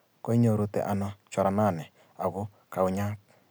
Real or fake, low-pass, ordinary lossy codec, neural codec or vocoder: real; none; none; none